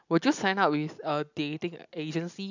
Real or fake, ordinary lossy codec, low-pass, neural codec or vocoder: real; none; 7.2 kHz; none